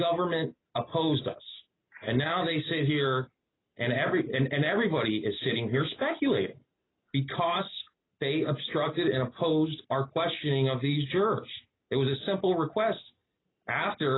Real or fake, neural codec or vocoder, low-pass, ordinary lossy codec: real; none; 7.2 kHz; AAC, 16 kbps